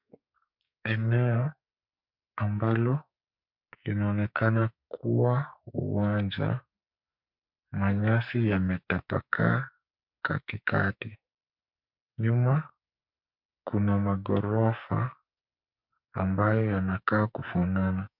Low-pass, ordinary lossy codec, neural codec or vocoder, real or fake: 5.4 kHz; MP3, 48 kbps; codec, 44.1 kHz, 2.6 kbps, SNAC; fake